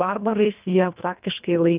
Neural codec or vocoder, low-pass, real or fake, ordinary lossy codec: codec, 24 kHz, 1.5 kbps, HILCodec; 3.6 kHz; fake; Opus, 24 kbps